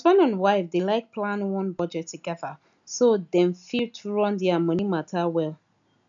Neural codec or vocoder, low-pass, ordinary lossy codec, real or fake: none; 7.2 kHz; none; real